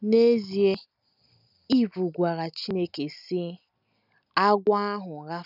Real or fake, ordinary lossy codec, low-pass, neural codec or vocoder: real; none; 5.4 kHz; none